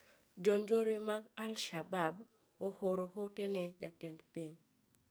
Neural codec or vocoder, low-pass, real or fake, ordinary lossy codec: codec, 44.1 kHz, 2.6 kbps, SNAC; none; fake; none